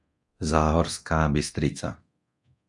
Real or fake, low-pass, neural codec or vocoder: fake; 10.8 kHz; codec, 24 kHz, 0.9 kbps, DualCodec